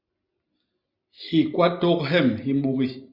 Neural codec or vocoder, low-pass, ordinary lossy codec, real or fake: none; 5.4 kHz; Opus, 64 kbps; real